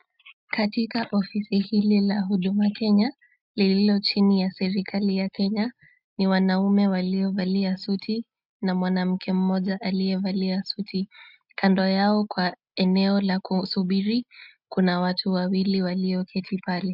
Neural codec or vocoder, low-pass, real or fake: none; 5.4 kHz; real